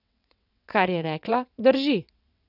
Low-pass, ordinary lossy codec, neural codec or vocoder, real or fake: 5.4 kHz; none; vocoder, 22.05 kHz, 80 mel bands, WaveNeXt; fake